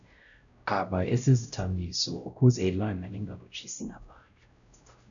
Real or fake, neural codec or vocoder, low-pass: fake; codec, 16 kHz, 0.5 kbps, X-Codec, WavLM features, trained on Multilingual LibriSpeech; 7.2 kHz